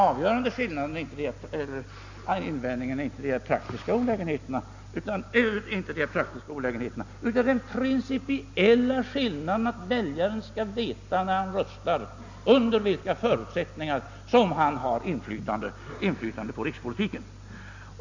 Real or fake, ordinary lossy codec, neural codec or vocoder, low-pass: real; Opus, 64 kbps; none; 7.2 kHz